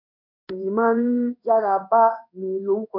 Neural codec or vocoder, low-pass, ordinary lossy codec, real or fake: codec, 16 kHz in and 24 kHz out, 1 kbps, XY-Tokenizer; 5.4 kHz; none; fake